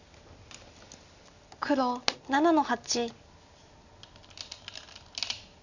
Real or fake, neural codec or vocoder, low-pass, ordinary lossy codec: real; none; 7.2 kHz; none